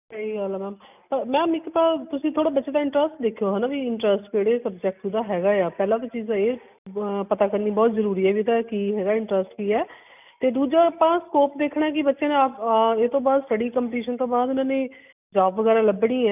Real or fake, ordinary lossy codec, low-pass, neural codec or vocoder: real; none; 3.6 kHz; none